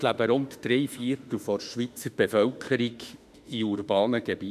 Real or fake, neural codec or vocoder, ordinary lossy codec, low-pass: fake; autoencoder, 48 kHz, 32 numbers a frame, DAC-VAE, trained on Japanese speech; none; 14.4 kHz